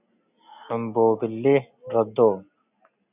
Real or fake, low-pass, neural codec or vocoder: real; 3.6 kHz; none